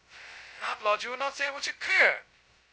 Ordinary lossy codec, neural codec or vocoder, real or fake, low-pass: none; codec, 16 kHz, 0.2 kbps, FocalCodec; fake; none